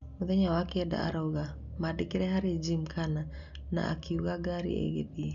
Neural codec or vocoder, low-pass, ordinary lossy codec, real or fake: none; 7.2 kHz; none; real